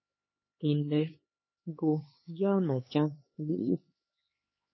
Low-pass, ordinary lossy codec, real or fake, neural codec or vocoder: 7.2 kHz; MP3, 24 kbps; fake; codec, 16 kHz, 4 kbps, X-Codec, HuBERT features, trained on LibriSpeech